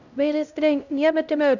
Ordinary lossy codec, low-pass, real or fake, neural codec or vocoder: none; 7.2 kHz; fake; codec, 16 kHz, 0.5 kbps, X-Codec, HuBERT features, trained on LibriSpeech